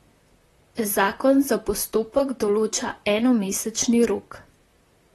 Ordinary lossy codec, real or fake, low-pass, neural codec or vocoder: AAC, 32 kbps; fake; 19.8 kHz; vocoder, 44.1 kHz, 128 mel bands, Pupu-Vocoder